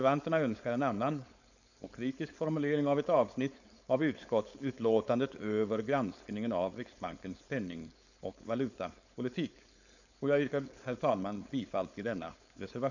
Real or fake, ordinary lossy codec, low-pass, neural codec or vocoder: fake; none; 7.2 kHz; codec, 16 kHz, 4.8 kbps, FACodec